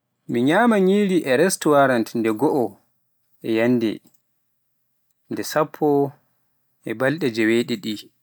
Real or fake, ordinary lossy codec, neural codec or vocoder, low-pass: real; none; none; none